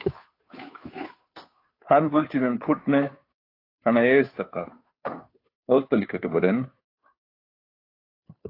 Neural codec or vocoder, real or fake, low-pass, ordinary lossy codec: codec, 16 kHz, 2 kbps, FunCodec, trained on Chinese and English, 25 frames a second; fake; 5.4 kHz; AAC, 32 kbps